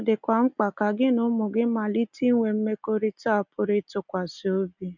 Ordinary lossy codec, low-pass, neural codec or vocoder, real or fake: none; 7.2 kHz; none; real